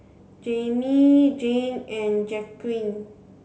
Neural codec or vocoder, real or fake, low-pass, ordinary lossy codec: none; real; none; none